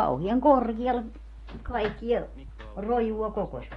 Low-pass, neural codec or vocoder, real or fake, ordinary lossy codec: 10.8 kHz; none; real; AAC, 32 kbps